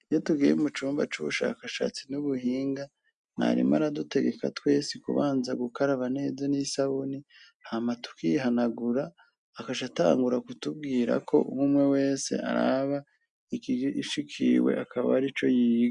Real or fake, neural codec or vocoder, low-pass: real; none; 9.9 kHz